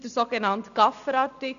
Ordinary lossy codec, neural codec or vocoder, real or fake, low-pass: none; none; real; 7.2 kHz